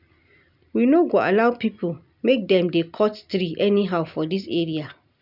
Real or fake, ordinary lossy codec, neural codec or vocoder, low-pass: real; none; none; 5.4 kHz